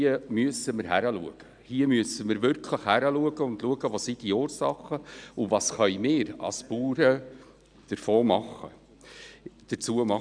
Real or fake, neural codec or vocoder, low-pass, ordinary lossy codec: real; none; 9.9 kHz; none